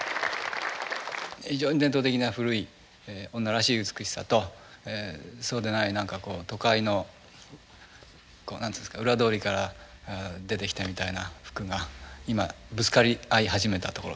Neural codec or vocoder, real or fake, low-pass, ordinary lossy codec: none; real; none; none